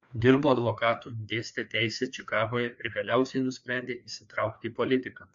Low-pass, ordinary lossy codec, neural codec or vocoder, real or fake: 7.2 kHz; AAC, 64 kbps; codec, 16 kHz, 2 kbps, FreqCodec, larger model; fake